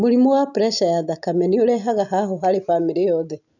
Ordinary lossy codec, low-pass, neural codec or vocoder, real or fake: none; 7.2 kHz; none; real